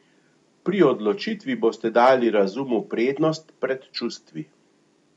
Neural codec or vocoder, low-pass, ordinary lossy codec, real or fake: none; 10.8 kHz; MP3, 64 kbps; real